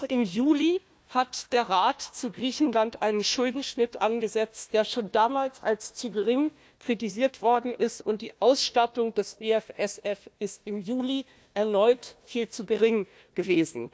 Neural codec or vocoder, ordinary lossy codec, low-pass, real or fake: codec, 16 kHz, 1 kbps, FunCodec, trained on Chinese and English, 50 frames a second; none; none; fake